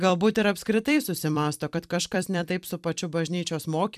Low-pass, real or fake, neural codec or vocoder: 14.4 kHz; fake; vocoder, 44.1 kHz, 128 mel bands every 256 samples, BigVGAN v2